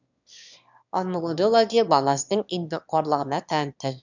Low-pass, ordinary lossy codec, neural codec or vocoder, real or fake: 7.2 kHz; none; autoencoder, 22.05 kHz, a latent of 192 numbers a frame, VITS, trained on one speaker; fake